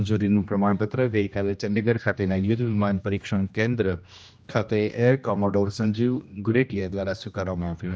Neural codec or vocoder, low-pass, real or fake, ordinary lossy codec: codec, 16 kHz, 1 kbps, X-Codec, HuBERT features, trained on general audio; none; fake; none